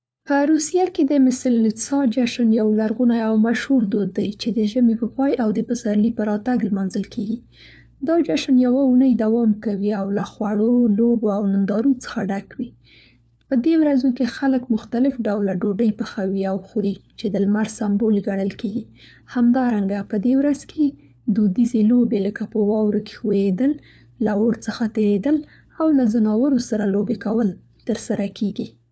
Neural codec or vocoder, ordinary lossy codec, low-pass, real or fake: codec, 16 kHz, 4 kbps, FunCodec, trained on LibriTTS, 50 frames a second; none; none; fake